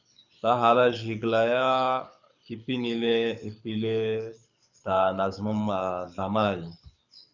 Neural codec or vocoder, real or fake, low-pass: codec, 24 kHz, 6 kbps, HILCodec; fake; 7.2 kHz